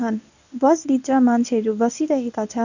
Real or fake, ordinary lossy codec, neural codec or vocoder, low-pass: fake; none; codec, 24 kHz, 0.9 kbps, WavTokenizer, medium speech release version 1; 7.2 kHz